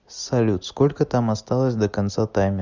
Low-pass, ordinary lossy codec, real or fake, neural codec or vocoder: 7.2 kHz; Opus, 64 kbps; real; none